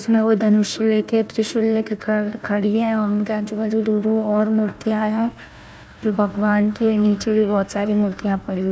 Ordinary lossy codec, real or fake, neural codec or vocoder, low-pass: none; fake; codec, 16 kHz, 1 kbps, FunCodec, trained on Chinese and English, 50 frames a second; none